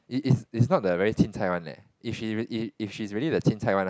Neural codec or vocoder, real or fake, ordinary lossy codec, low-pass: none; real; none; none